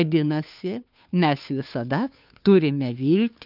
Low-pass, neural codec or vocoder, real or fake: 5.4 kHz; codec, 16 kHz, 2 kbps, FunCodec, trained on Chinese and English, 25 frames a second; fake